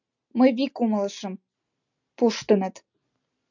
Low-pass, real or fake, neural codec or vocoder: 7.2 kHz; real; none